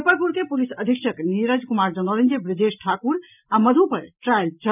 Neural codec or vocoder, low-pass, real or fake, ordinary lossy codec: none; 3.6 kHz; real; none